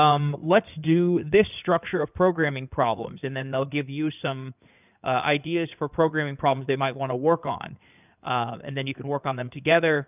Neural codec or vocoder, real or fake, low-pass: codec, 16 kHz in and 24 kHz out, 2.2 kbps, FireRedTTS-2 codec; fake; 3.6 kHz